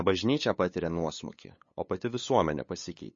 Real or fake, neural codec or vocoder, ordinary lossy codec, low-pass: fake; codec, 16 kHz, 8 kbps, FunCodec, trained on LibriTTS, 25 frames a second; MP3, 32 kbps; 7.2 kHz